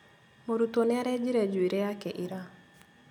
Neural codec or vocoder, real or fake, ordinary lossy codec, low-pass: none; real; none; 19.8 kHz